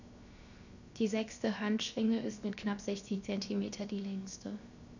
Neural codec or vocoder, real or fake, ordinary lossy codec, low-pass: codec, 16 kHz, 0.7 kbps, FocalCodec; fake; none; 7.2 kHz